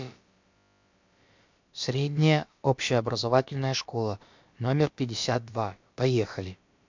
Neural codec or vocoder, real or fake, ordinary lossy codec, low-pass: codec, 16 kHz, about 1 kbps, DyCAST, with the encoder's durations; fake; MP3, 64 kbps; 7.2 kHz